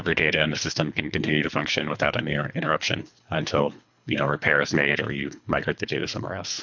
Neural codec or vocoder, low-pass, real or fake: codec, 24 kHz, 3 kbps, HILCodec; 7.2 kHz; fake